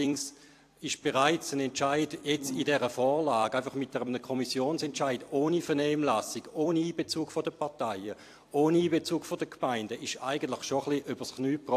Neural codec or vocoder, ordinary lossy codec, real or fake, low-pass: vocoder, 44.1 kHz, 128 mel bands every 256 samples, BigVGAN v2; AAC, 64 kbps; fake; 14.4 kHz